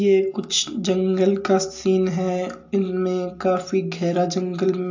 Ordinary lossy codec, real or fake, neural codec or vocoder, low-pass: AAC, 48 kbps; real; none; 7.2 kHz